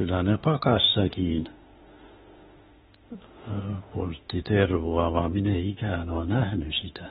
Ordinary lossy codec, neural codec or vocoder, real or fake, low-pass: AAC, 16 kbps; autoencoder, 48 kHz, 32 numbers a frame, DAC-VAE, trained on Japanese speech; fake; 19.8 kHz